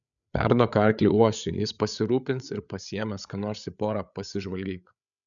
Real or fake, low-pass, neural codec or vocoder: fake; 7.2 kHz; codec, 16 kHz, 8 kbps, FreqCodec, larger model